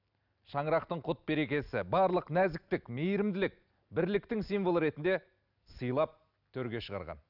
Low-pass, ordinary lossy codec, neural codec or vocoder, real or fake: 5.4 kHz; none; none; real